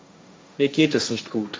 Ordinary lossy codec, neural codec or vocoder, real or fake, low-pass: none; codec, 16 kHz, 1.1 kbps, Voila-Tokenizer; fake; none